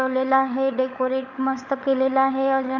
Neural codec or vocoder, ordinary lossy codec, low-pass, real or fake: codec, 16 kHz, 16 kbps, FunCodec, trained on LibriTTS, 50 frames a second; none; 7.2 kHz; fake